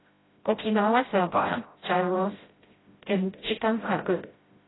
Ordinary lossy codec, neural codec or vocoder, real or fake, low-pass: AAC, 16 kbps; codec, 16 kHz, 0.5 kbps, FreqCodec, smaller model; fake; 7.2 kHz